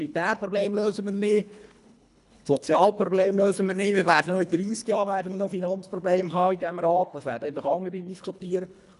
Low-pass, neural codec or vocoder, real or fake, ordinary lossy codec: 10.8 kHz; codec, 24 kHz, 1.5 kbps, HILCodec; fake; none